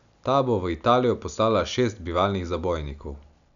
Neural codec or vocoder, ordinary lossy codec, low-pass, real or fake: none; none; 7.2 kHz; real